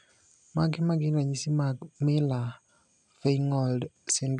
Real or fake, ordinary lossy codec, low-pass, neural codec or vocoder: real; none; 10.8 kHz; none